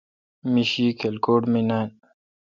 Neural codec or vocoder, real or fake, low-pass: none; real; 7.2 kHz